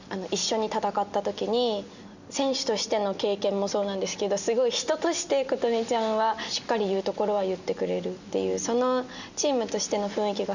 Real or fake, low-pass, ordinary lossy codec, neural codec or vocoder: real; 7.2 kHz; none; none